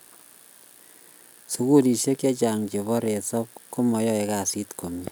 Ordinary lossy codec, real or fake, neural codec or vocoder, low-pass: none; real; none; none